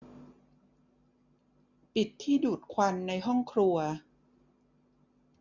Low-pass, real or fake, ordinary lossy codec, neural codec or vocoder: 7.2 kHz; real; none; none